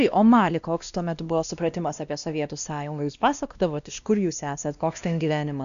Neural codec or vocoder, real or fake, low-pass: codec, 16 kHz, 1 kbps, X-Codec, WavLM features, trained on Multilingual LibriSpeech; fake; 7.2 kHz